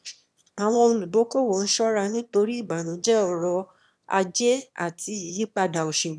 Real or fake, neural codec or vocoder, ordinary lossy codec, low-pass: fake; autoencoder, 22.05 kHz, a latent of 192 numbers a frame, VITS, trained on one speaker; none; none